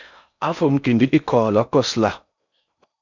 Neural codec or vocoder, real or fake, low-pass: codec, 16 kHz in and 24 kHz out, 0.6 kbps, FocalCodec, streaming, 4096 codes; fake; 7.2 kHz